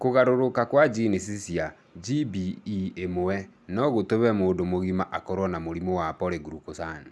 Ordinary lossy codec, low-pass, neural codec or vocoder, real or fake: none; none; none; real